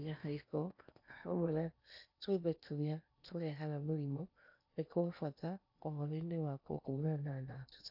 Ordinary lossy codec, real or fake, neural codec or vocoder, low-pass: AAC, 48 kbps; fake; codec, 16 kHz in and 24 kHz out, 0.8 kbps, FocalCodec, streaming, 65536 codes; 5.4 kHz